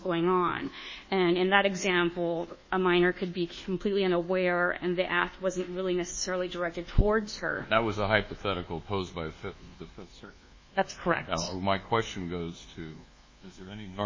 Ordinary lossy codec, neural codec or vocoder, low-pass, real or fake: MP3, 32 kbps; codec, 24 kHz, 1.2 kbps, DualCodec; 7.2 kHz; fake